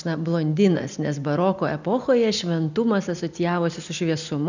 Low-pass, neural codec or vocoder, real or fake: 7.2 kHz; none; real